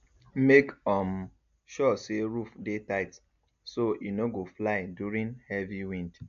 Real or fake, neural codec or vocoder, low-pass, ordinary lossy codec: real; none; 7.2 kHz; AAC, 96 kbps